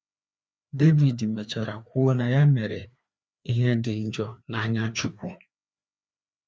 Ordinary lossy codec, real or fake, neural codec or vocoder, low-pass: none; fake; codec, 16 kHz, 2 kbps, FreqCodec, larger model; none